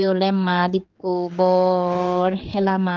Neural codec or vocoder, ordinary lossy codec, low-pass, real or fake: codec, 16 kHz, 4 kbps, X-Codec, HuBERT features, trained on general audio; Opus, 32 kbps; 7.2 kHz; fake